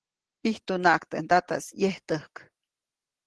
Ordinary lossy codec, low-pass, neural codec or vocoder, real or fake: Opus, 16 kbps; 10.8 kHz; none; real